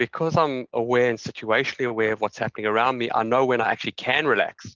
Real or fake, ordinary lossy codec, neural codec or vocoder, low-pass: real; Opus, 16 kbps; none; 7.2 kHz